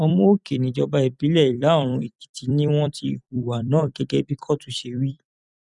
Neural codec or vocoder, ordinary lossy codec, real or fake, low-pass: vocoder, 44.1 kHz, 128 mel bands every 256 samples, BigVGAN v2; none; fake; 10.8 kHz